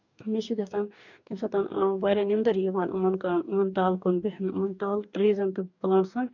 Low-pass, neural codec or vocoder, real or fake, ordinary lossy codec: 7.2 kHz; codec, 44.1 kHz, 2.6 kbps, DAC; fake; none